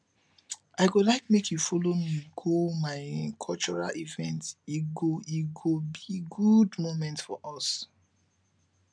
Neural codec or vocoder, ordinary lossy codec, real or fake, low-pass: none; none; real; 9.9 kHz